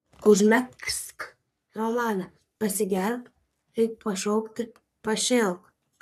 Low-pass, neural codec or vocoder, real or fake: 14.4 kHz; codec, 44.1 kHz, 3.4 kbps, Pupu-Codec; fake